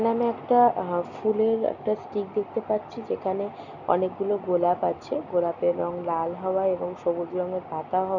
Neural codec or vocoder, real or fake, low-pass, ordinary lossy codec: none; real; 7.2 kHz; none